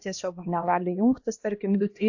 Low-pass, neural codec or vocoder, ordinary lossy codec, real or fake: 7.2 kHz; codec, 16 kHz, 1 kbps, X-Codec, HuBERT features, trained on LibriSpeech; AAC, 48 kbps; fake